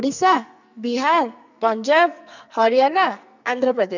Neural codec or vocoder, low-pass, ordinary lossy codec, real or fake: codec, 44.1 kHz, 2.6 kbps, SNAC; 7.2 kHz; none; fake